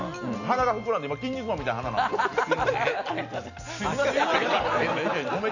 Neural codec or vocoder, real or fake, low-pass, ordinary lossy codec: none; real; 7.2 kHz; none